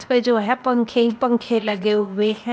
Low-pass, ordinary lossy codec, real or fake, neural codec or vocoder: none; none; fake; codec, 16 kHz, 0.8 kbps, ZipCodec